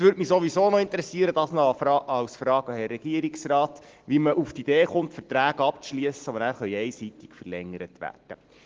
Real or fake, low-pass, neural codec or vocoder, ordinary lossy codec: real; 7.2 kHz; none; Opus, 16 kbps